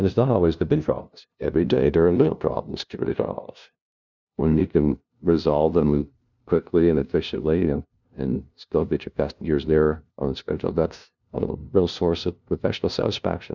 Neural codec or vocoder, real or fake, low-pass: codec, 16 kHz, 0.5 kbps, FunCodec, trained on LibriTTS, 25 frames a second; fake; 7.2 kHz